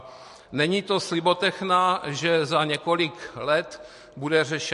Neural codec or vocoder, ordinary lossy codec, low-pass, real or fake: none; MP3, 48 kbps; 14.4 kHz; real